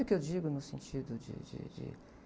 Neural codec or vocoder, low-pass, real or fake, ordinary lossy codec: none; none; real; none